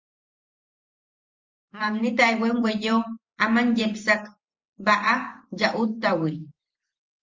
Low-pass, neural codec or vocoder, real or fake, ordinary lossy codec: 7.2 kHz; vocoder, 44.1 kHz, 128 mel bands every 512 samples, BigVGAN v2; fake; Opus, 32 kbps